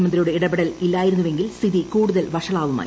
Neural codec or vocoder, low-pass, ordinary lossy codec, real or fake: none; none; none; real